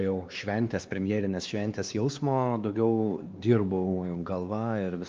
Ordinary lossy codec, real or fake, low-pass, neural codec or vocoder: Opus, 32 kbps; fake; 7.2 kHz; codec, 16 kHz, 2 kbps, X-Codec, WavLM features, trained on Multilingual LibriSpeech